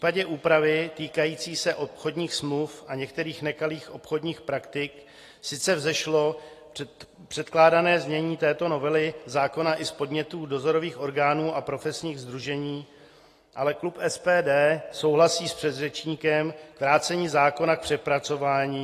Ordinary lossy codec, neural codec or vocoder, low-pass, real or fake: AAC, 48 kbps; none; 14.4 kHz; real